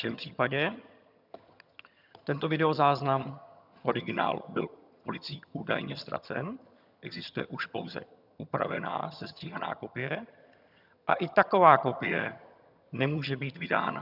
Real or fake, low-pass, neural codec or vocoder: fake; 5.4 kHz; vocoder, 22.05 kHz, 80 mel bands, HiFi-GAN